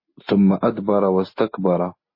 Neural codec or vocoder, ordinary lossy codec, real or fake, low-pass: none; MP3, 24 kbps; real; 5.4 kHz